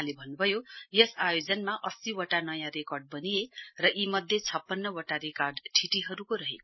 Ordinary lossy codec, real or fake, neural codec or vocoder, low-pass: MP3, 24 kbps; real; none; 7.2 kHz